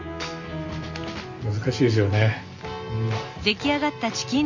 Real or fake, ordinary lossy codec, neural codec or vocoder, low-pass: real; none; none; 7.2 kHz